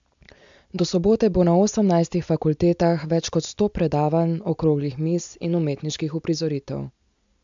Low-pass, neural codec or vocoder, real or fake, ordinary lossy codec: 7.2 kHz; none; real; MP3, 64 kbps